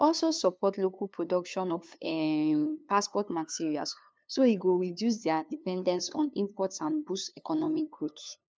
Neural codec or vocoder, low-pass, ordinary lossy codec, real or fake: codec, 16 kHz, 2 kbps, FunCodec, trained on LibriTTS, 25 frames a second; none; none; fake